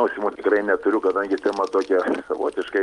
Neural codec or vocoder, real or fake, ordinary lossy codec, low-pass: none; real; Opus, 32 kbps; 10.8 kHz